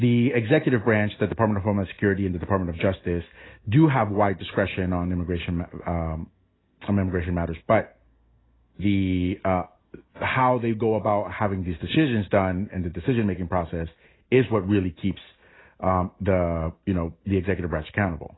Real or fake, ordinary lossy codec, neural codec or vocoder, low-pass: real; AAC, 16 kbps; none; 7.2 kHz